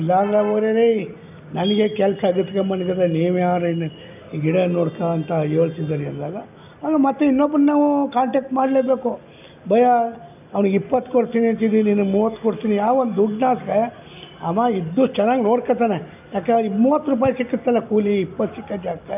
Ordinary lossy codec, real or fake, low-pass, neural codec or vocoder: none; real; 3.6 kHz; none